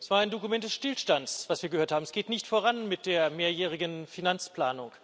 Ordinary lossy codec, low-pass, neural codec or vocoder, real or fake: none; none; none; real